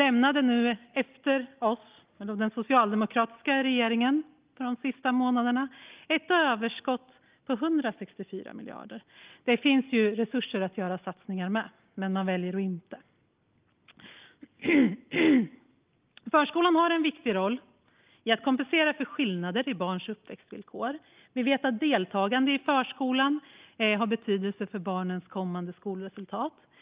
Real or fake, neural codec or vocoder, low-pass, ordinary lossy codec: real; none; 3.6 kHz; Opus, 32 kbps